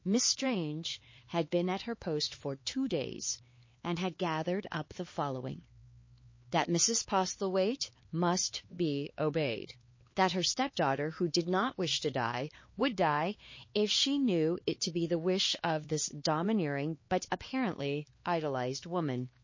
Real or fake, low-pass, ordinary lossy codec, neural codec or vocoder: fake; 7.2 kHz; MP3, 32 kbps; codec, 16 kHz, 4 kbps, X-Codec, HuBERT features, trained on LibriSpeech